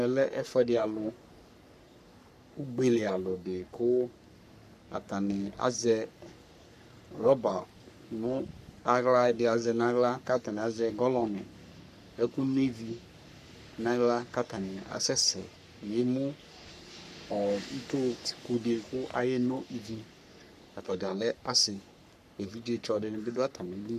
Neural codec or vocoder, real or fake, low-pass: codec, 44.1 kHz, 3.4 kbps, Pupu-Codec; fake; 14.4 kHz